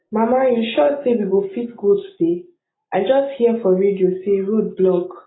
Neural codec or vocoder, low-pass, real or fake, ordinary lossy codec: none; 7.2 kHz; real; AAC, 16 kbps